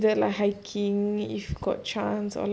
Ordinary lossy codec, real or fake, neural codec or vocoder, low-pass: none; real; none; none